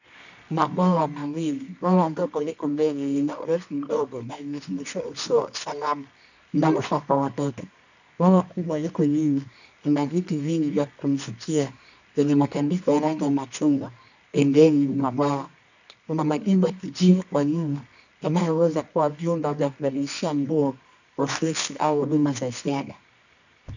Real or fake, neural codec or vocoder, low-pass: fake; codec, 24 kHz, 0.9 kbps, WavTokenizer, medium music audio release; 7.2 kHz